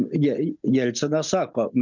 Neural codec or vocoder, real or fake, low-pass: none; real; 7.2 kHz